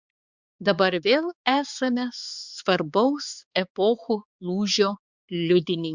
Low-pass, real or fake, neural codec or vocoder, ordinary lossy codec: 7.2 kHz; fake; codec, 16 kHz, 4 kbps, X-Codec, HuBERT features, trained on balanced general audio; Opus, 64 kbps